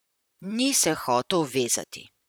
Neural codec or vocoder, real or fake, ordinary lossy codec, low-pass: vocoder, 44.1 kHz, 128 mel bands, Pupu-Vocoder; fake; none; none